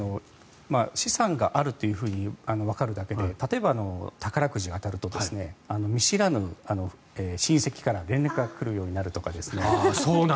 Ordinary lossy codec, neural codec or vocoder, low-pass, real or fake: none; none; none; real